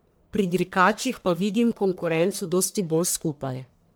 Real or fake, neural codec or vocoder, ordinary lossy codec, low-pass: fake; codec, 44.1 kHz, 1.7 kbps, Pupu-Codec; none; none